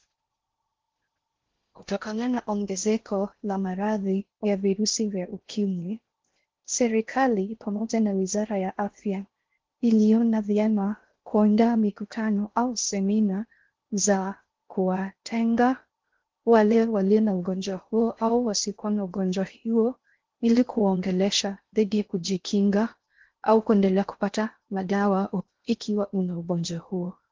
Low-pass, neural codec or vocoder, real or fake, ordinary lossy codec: 7.2 kHz; codec, 16 kHz in and 24 kHz out, 0.6 kbps, FocalCodec, streaming, 4096 codes; fake; Opus, 16 kbps